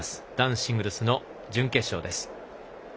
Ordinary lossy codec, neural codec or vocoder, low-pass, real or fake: none; none; none; real